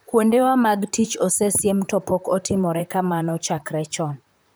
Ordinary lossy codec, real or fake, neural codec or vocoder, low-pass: none; fake; vocoder, 44.1 kHz, 128 mel bands, Pupu-Vocoder; none